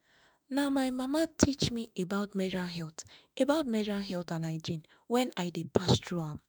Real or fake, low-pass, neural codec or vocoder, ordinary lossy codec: fake; none; autoencoder, 48 kHz, 32 numbers a frame, DAC-VAE, trained on Japanese speech; none